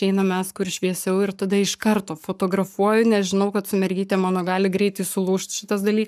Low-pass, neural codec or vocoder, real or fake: 14.4 kHz; codec, 44.1 kHz, 7.8 kbps, Pupu-Codec; fake